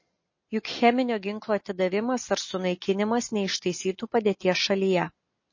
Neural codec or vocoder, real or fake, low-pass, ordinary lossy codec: none; real; 7.2 kHz; MP3, 32 kbps